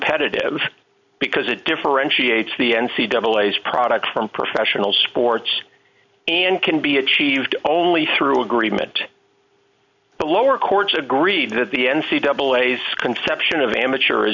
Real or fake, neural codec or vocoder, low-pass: real; none; 7.2 kHz